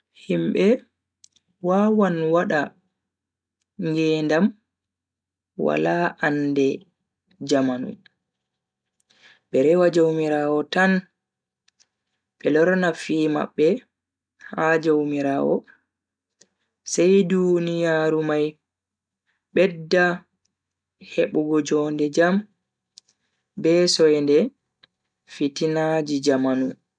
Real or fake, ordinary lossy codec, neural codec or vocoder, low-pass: real; none; none; 9.9 kHz